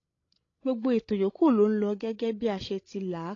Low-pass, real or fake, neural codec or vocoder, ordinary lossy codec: 7.2 kHz; fake; codec, 16 kHz, 16 kbps, FreqCodec, larger model; AAC, 32 kbps